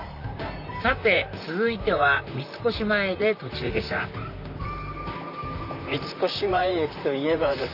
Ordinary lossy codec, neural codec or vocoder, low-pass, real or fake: none; vocoder, 44.1 kHz, 128 mel bands, Pupu-Vocoder; 5.4 kHz; fake